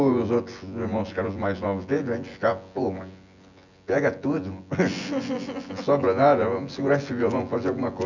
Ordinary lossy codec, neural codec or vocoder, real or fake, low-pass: none; vocoder, 24 kHz, 100 mel bands, Vocos; fake; 7.2 kHz